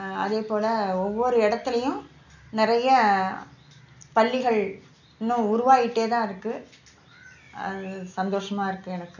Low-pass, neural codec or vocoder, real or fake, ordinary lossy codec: 7.2 kHz; none; real; none